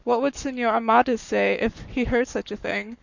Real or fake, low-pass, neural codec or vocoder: fake; 7.2 kHz; codec, 44.1 kHz, 7.8 kbps, Pupu-Codec